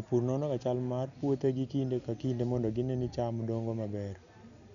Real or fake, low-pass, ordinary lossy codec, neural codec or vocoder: real; 7.2 kHz; none; none